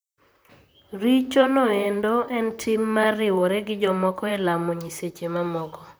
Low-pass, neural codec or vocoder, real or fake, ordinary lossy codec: none; vocoder, 44.1 kHz, 128 mel bands, Pupu-Vocoder; fake; none